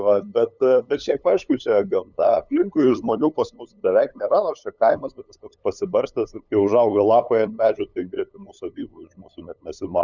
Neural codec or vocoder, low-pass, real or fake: codec, 16 kHz, 8 kbps, FunCodec, trained on LibriTTS, 25 frames a second; 7.2 kHz; fake